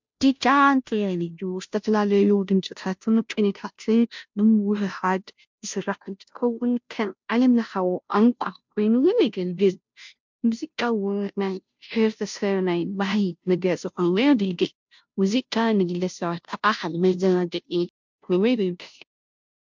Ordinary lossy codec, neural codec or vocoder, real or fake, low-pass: MP3, 64 kbps; codec, 16 kHz, 0.5 kbps, FunCodec, trained on Chinese and English, 25 frames a second; fake; 7.2 kHz